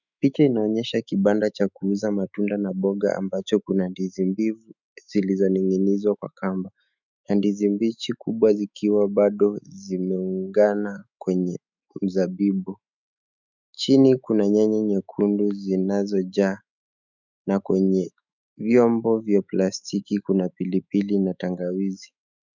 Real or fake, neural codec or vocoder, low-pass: fake; autoencoder, 48 kHz, 128 numbers a frame, DAC-VAE, trained on Japanese speech; 7.2 kHz